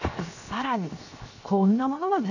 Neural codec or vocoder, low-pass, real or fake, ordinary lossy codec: codec, 16 kHz, 0.7 kbps, FocalCodec; 7.2 kHz; fake; none